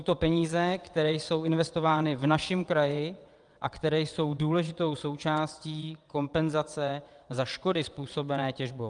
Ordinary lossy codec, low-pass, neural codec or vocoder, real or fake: Opus, 32 kbps; 9.9 kHz; vocoder, 22.05 kHz, 80 mel bands, WaveNeXt; fake